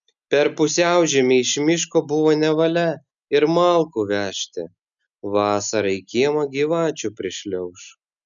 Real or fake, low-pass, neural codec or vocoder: real; 7.2 kHz; none